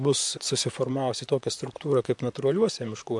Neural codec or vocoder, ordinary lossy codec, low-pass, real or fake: vocoder, 44.1 kHz, 128 mel bands, Pupu-Vocoder; MP3, 64 kbps; 10.8 kHz; fake